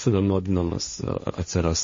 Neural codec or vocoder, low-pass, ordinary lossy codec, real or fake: codec, 16 kHz, 1.1 kbps, Voila-Tokenizer; 7.2 kHz; MP3, 32 kbps; fake